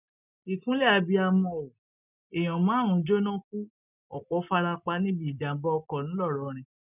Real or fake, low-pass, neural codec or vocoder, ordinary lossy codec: real; 3.6 kHz; none; none